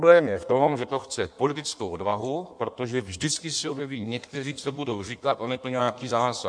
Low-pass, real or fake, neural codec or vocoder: 9.9 kHz; fake; codec, 16 kHz in and 24 kHz out, 1.1 kbps, FireRedTTS-2 codec